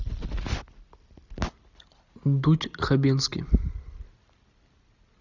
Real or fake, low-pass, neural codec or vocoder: real; 7.2 kHz; none